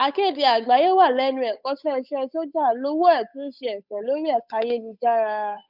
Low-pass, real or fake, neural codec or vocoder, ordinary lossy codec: 5.4 kHz; fake; codec, 16 kHz, 8 kbps, FunCodec, trained on Chinese and English, 25 frames a second; none